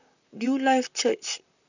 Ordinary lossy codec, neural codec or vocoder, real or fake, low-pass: none; vocoder, 44.1 kHz, 128 mel bands, Pupu-Vocoder; fake; 7.2 kHz